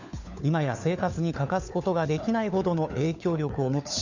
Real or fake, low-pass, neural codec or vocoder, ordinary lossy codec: fake; 7.2 kHz; codec, 16 kHz, 4 kbps, FunCodec, trained on LibriTTS, 50 frames a second; none